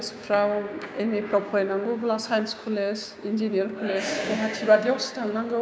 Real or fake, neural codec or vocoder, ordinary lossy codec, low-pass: fake; codec, 16 kHz, 6 kbps, DAC; none; none